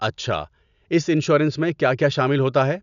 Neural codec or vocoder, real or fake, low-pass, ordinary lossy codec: none; real; 7.2 kHz; none